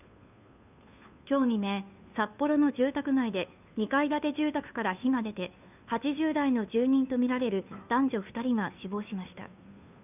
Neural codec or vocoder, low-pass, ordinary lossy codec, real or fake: codec, 16 kHz, 2 kbps, FunCodec, trained on Chinese and English, 25 frames a second; 3.6 kHz; none; fake